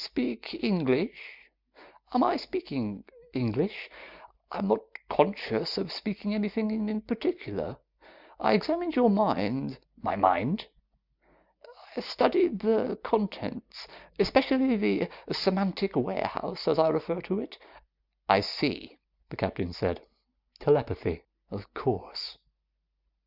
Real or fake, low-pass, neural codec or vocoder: real; 5.4 kHz; none